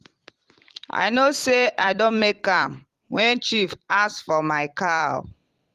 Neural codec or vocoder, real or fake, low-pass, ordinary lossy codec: vocoder, 44.1 kHz, 128 mel bands every 256 samples, BigVGAN v2; fake; 14.4 kHz; Opus, 24 kbps